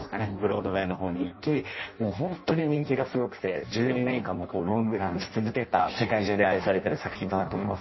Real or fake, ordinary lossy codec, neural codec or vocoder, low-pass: fake; MP3, 24 kbps; codec, 16 kHz in and 24 kHz out, 0.6 kbps, FireRedTTS-2 codec; 7.2 kHz